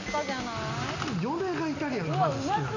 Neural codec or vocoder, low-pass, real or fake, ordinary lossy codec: none; 7.2 kHz; real; none